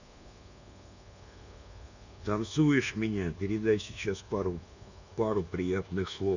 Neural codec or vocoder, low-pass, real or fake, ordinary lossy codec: codec, 24 kHz, 1.2 kbps, DualCodec; 7.2 kHz; fake; none